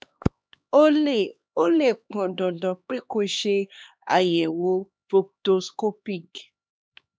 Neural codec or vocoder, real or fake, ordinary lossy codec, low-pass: codec, 16 kHz, 2 kbps, X-Codec, HuBERT features, trained on LibriSpeech; fake; none; none